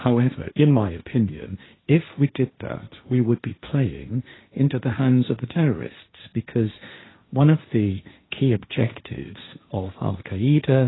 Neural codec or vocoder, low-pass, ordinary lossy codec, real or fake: codec, 16 kHz, 1.1 kbps, Voila-Tokenizer; 7.2 kHz; AAC, 16 kbps; fake